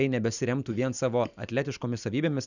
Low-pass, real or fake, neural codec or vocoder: 7.2 kHz; real; none